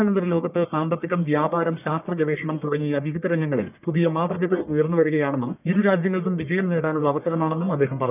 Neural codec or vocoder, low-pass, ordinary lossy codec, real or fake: codec, 44.1 kHz, 1.7 kbps, Pupu-Codec; 3.6 kHz; none; fake